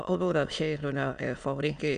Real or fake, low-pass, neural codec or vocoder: fake; 9.9 kHz; autoencoder, 22.05 kHz, a latent of 192 numbers a frame, VITS, trained on many speakers